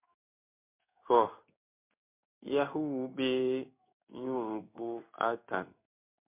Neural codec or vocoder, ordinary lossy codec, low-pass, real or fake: codec, 16 kHz in and 24 kHz out, 1 kbps, XY-Tokenizer; MP3, 32 kbps; 3.6 kHz; fake